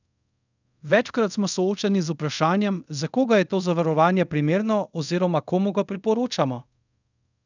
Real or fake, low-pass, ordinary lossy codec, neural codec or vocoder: fake; 7.2 kHz; none; codec, 24 kHz, 0.5 kbps, DualCodec